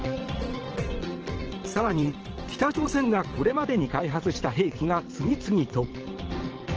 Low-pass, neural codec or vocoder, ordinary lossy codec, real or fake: 7.2 kHz; vocoder, 22.05 kHz, 80 mel bands, WaveNeXt; Opus, 16 kbps; fake